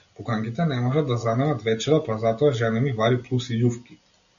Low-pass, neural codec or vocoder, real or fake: 7.2 kHz; none; real